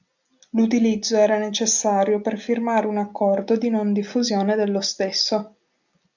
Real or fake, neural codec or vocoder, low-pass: real; none; 7.2 kHz